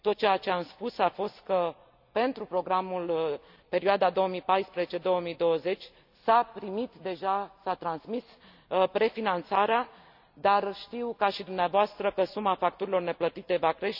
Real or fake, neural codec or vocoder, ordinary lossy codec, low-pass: real; none; none; 5.4 kHz